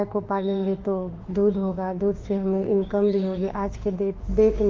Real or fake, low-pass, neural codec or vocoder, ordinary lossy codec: fake; 7.2 kHz; autoencoder, 48 kHz, 32 numbers a frame, DAC-VAE, trained on Japanese speech; Opus, 32 kbps